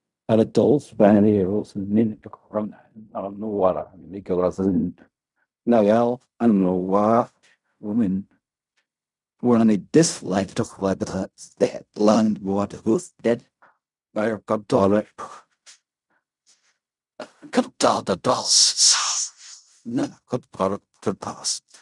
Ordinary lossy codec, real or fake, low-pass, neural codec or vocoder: none; fake; 10.8 kHz; codec, 16 kHz in and 24 kHz out, 0.4 kbps, LongCat-Audio-Codec, fine tuned four codebook decoder